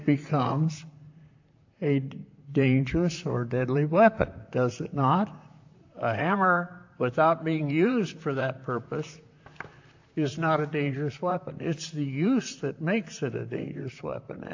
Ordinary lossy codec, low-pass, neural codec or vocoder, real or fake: AAC, 48 kbps; 7.2 kHz; vocoder, 44.1 kHz, 128 mel bands, Pupu-Vocoder; fake